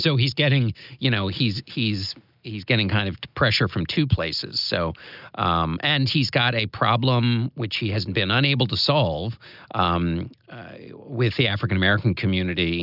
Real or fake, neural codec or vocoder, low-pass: real; none; 5.4 kHz